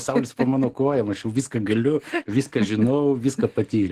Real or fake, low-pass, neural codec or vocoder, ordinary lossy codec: fake; 14.4 kHz; codec, 44.1 kHz, 7.8 kbps, DAC; Opus, 16 kbps